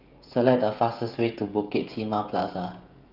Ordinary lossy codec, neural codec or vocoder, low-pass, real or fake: Opus, 32 kbps; codec, 16 kHz, 16 kbps, FreqCodec, smaller model; 5.4 kHz; fake